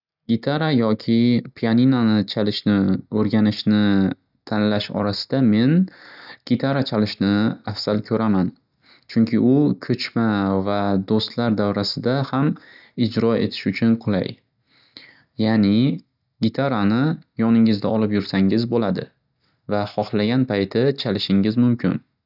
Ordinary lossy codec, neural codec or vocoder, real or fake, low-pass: none; none; real; 5.4 kHz